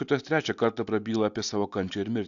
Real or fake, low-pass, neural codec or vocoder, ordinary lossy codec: real; 7.2 kHz; none; AAC, 64 kbps